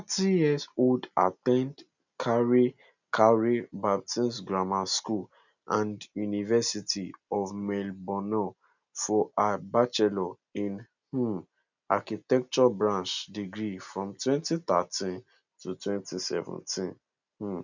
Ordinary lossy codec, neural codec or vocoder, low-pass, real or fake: none; none; 7.2 kHz; real